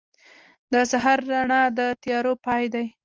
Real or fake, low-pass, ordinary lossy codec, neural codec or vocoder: real; 7.2 kHz; Opus, 24 kbps; none